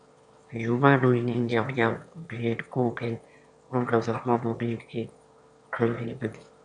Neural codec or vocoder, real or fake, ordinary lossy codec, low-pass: autoencoder, 22.05 kHz, a latent of 192 numbers a frame, VITS, trained on one speaker; fake; AAC, 64 kbps; 9.9 kHz